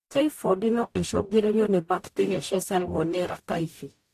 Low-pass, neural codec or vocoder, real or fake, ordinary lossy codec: 14.4 kHz; codec, 44.1 kHz, 0.9 kbps, DAC; fake; AAC, 64 kbps